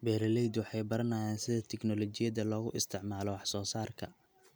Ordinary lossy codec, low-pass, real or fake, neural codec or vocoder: none; none; real; none